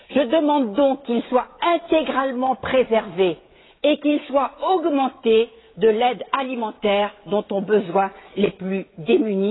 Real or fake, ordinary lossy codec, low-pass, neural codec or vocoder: fake; AAC, 16 kbps; 7.2 kHz; codec, 16 kHz, 16 kbps, FreqCodec, smaller model